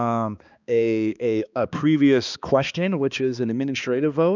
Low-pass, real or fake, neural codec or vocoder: 7.2 kHz; fake; codec, 16 kHz, 2 kbps, X-Codec, HuBERT features, trained on balanced general audio